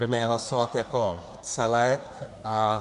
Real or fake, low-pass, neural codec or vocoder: fake; 10.8 kHz; codec, 24 kHz, 1 kbps, SNAC